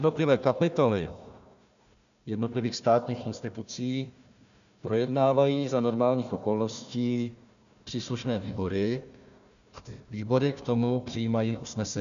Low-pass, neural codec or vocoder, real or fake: 7.2 kHz; codec, 16 kHz, 1 kbps, FunCodec, trained on Chinese and English, 50 frames a second; fake